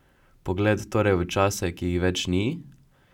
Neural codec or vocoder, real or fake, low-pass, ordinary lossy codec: none; real; 19.8 kHz; none